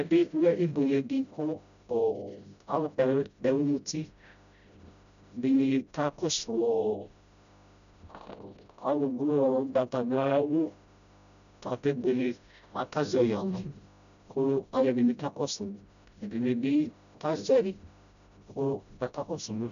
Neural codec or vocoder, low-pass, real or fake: codec, 16 kHz, 0.5 kbps, FreqCodec, smaller model; 7.2 kHz; fake